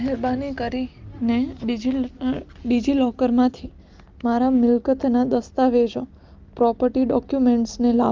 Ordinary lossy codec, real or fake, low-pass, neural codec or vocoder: Opus, 32 kbps; real; 7.2 kHz; none